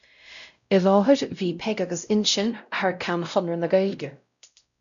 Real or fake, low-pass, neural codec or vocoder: fake; 7.2 kHz; codec, 16 kHz, 0.5 kbps, X-Codec, WavLM features, trained on Multilingual LibriSpeech